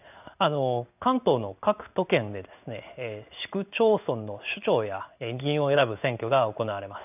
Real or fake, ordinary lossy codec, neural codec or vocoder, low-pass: fake; none; codec, 16 kHz in and 24 kHz out, 1 kbps, XY-Tokenizer; 3.6 kHz